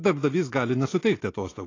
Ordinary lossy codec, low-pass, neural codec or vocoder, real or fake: AAC, 32 kbps; 7.2 kHz; none; real